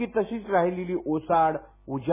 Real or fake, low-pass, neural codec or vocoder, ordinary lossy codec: real; 3.6 kHz; none; MP3, 16 kbps